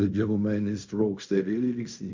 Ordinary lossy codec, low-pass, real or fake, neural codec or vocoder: MP3, 48 kbps; 7.2 kHz; fake; codec, 16 kHz in and 24 kHz out, 0.4 kbps, LongCat-Audio-Codec, fine tuned four codebook decoder